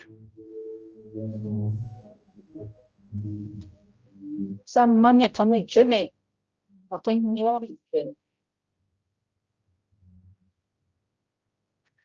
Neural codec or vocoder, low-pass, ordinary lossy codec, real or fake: codec, 16 kHz, 0.5 kbps, X-Codec, HuBERT features, trained on general audio; 7.2 kHz; Opus, 24 kbps; fake